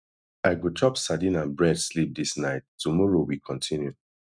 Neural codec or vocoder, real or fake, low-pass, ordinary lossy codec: none; real; 9.9 kHz; none